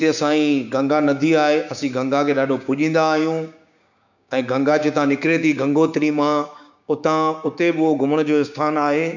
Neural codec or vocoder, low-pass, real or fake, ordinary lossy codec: codec, 16 kHz, 6 kbps, DAC; 7.2 kHz; fake; none